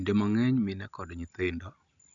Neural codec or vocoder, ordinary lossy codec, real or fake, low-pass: none; none; real; 7.2 kHz